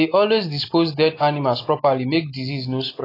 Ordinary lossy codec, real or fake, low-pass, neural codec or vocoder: AAC, 24 kbps; real; 5.4 kHz; none